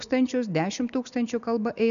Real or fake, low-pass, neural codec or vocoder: real; 7.2 kHz; none